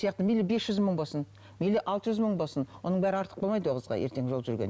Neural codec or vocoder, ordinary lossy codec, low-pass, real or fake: none; none; none; real